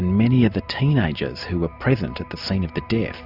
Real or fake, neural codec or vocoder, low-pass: real; none; 5.4 kHz